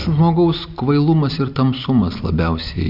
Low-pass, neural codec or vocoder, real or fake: 5.4 kHz; none; real